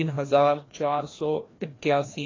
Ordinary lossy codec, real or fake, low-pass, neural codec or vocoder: AAC, 32 kbps; fake; 7.2 kHz; codec, 16 kHz, 1 kbps, FreqCodec, larger model